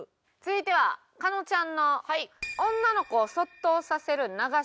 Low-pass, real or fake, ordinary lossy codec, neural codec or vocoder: none; real; none; none